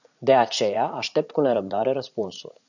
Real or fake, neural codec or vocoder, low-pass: fake; vocoder, 44.1 kHz, 80 mel bands, Vocos; 7.2 kHz